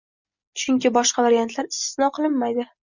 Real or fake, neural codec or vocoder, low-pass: real; none; 7.2 kHz